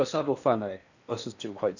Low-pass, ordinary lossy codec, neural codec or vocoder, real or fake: 7.2 kHz; none; codec, 16 kHz in and 24 kHz out, 0.8 kbps, FocalCodec, streaming, 65536 codes; fake